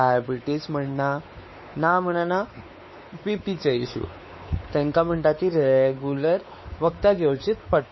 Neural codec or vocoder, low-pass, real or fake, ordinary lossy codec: codec, 16 kHz, 4 kbps, X-Codec, WavLM features, trained on Multilingual LibriSpeech; 7.2 kHz; fake; MP3, 24 kbps